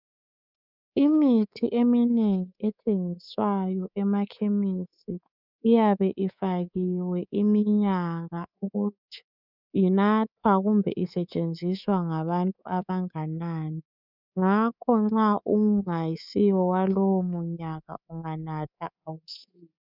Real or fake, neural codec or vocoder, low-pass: fake; codec, 24 kHz, 3.1 kbps, DualCodec; 5.4 kHz